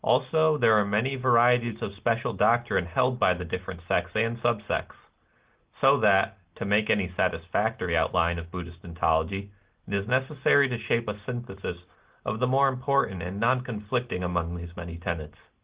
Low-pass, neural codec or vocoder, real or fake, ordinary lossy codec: 3.6 kHz; none; real; Opus, 16 kbps